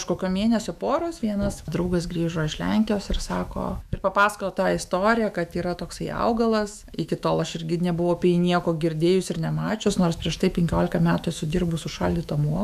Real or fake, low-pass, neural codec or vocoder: fake; 14.4 kHz; autoencoder, 48 kHz, 128 numbers a frame, DAC-VAE, trained on Japanese speech